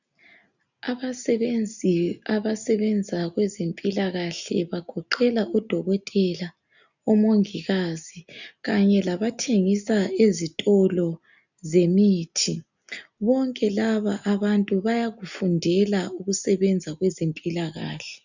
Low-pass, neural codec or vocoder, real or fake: 7.2 kHz; none; real